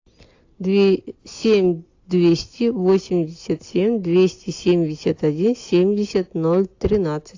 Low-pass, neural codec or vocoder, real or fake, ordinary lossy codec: 7.2 kHz; none; real; AAC, 48 kbps